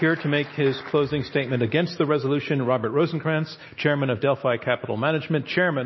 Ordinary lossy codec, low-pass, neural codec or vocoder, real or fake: MP3, 24 kbps; 7.2 kHz; none; real